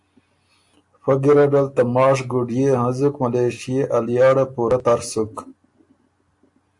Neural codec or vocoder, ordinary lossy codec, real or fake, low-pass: none; AAC, 64 kbps; real; 10.8 kHz